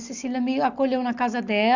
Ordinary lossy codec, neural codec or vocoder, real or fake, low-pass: none; none; real; 7.2 kHz